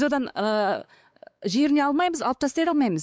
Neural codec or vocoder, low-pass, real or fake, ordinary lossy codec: codec, 16 kHz, 4 kbps, X-Codec, WavLM features, trained on Multilingual LibriSpeech; none; fake; none